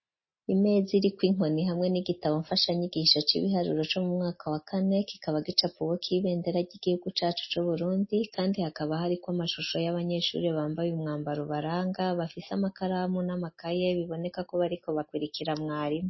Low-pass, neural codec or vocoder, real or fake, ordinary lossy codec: 7.2 kHz; none; real; MP3, 24 kbps